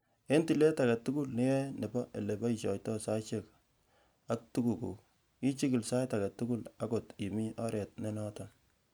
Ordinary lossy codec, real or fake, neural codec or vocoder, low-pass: none; real; none; none